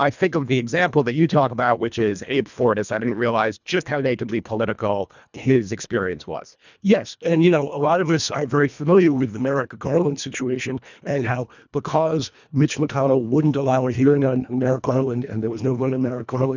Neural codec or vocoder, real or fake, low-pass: codec, 24 kHz, 1.5 kbps, HILCodec; fake; 7.2 kHz